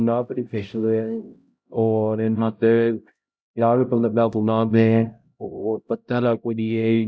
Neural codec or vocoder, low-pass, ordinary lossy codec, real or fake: codec, 16 kHz, 0.5 kbps, X-Codec, HuBERT features, trained on LibriSpeech; none; none; fake